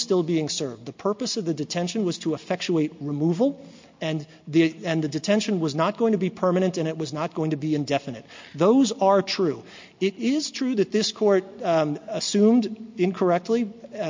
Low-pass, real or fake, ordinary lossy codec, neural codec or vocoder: 7.2 kHz; real; MP3, 48 kbps; none